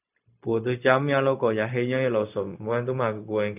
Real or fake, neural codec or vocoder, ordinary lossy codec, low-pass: fake; codec, 16 kHz, 0.4 kbps, LongCat-Audio-Codec; none; 3.6 kHz